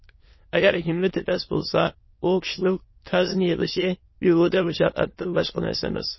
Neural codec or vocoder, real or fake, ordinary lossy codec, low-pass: autoencoder, 22.05 kHz, a latent of 192 numbers a frame, VITS, trained on many speakers; fake; MP3, 24 kbps; 7.2 kHz